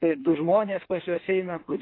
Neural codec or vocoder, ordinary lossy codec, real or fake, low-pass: codec, 16 kHz in and 24 kHz out, 1.1 kbps, FireRedTTS-2 codec; AAC, 24 kbps; fake; 5.4 kHz